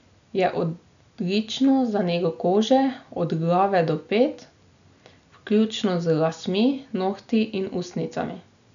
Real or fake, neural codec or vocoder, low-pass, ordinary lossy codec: real; none; 7.2 kHz; MP3, 96 kbps